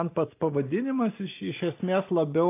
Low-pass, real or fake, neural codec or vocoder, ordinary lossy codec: 3.6 kHz; real; none; AAC, 24 kbps